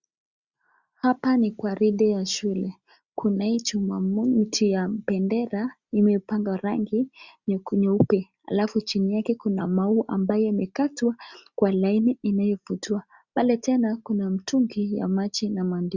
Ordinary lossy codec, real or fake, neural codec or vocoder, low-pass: Opus, 64 kbps; real; none; 7.2 kHz